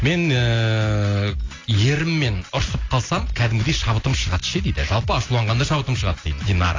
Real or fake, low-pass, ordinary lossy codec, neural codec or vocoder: real; 7.2 kHz; AAC, 32 kbps; none